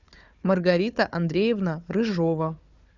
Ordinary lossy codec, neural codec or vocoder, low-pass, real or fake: Opus, 64 kbps; codec, 16 kHz, 4 kbps, FunCodec, trained on Chinese and English, 50 frames a second; 7.2 kHz; fake